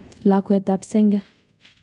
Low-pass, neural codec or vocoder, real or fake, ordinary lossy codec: 10.8 kHz; codec, 24 kHz, 0.5 kbps, DualCodec; fake; none